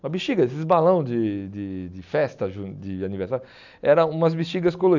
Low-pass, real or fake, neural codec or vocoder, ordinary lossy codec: 7.2 kHz; real; none; none